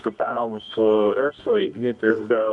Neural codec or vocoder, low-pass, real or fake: codec, 24 kHz, 0.9 kbps, WavTokenizer, medium music audio release; 10.8 kHz; fake